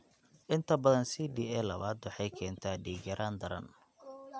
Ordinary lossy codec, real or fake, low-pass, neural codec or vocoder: none; real; none; none